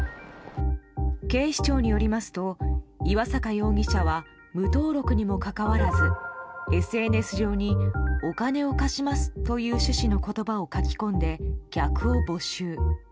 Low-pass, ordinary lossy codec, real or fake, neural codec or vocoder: none; none; real; none